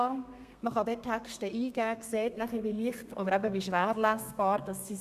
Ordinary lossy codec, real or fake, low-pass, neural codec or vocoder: none; fake; 14.4 kHz; codec, 32 kHz, 1.9 kbps, SNAC